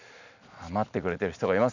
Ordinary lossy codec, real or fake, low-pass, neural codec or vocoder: none; real; 7.2 kHz; none